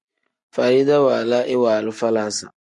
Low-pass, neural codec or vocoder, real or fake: 10.8 kHz; none; real